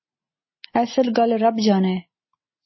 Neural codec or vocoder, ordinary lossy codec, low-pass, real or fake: none; MP3, 24 kbps; 7.2 kHz; real